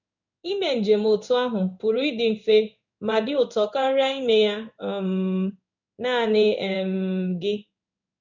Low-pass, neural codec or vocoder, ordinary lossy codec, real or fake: 7.2 kHz; codec, 16 kHz in and 24 kHz out, 1 kbps, XY-Tokenizer; none; fake